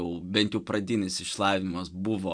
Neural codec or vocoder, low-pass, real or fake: none; 9.9 kHz; real